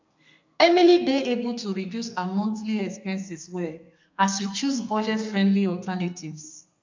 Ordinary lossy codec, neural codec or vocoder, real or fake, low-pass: MP3, 64 kbps; codec, 32 kHz, 1.9 kbps, SNAC; fake; 7.2 kHz